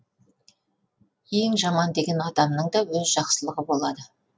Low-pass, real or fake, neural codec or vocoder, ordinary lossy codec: none; real; none; none